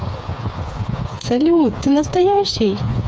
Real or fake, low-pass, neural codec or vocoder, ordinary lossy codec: fake; none; codec, 16 kHz, 4 kbps, FreqCodec, smaller model; none